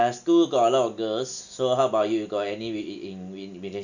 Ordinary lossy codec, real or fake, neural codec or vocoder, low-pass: none; real; none; 7.2 kHz